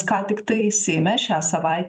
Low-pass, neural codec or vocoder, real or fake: 9.9 kHz; vocoder, 44.1 kHz, 128 mel bands every 256 samples, BigVGAN v2; fake